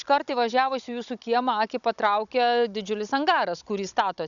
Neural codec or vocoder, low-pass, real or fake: codec, 16 kHz, 16 kbps, FunCodec, trained on Chinese and English, 50 frames a second; 7.2 kHz; fake